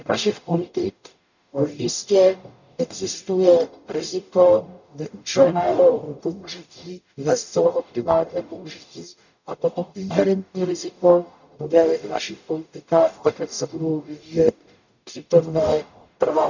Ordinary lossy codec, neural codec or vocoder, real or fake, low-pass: none; codec, 44.1 kHz, 0.9 kbps, DAC; fake; 7.2 kHz